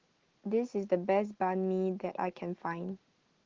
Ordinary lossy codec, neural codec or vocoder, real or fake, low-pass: Opus, 16 kbps; none; real; 7.2 kHz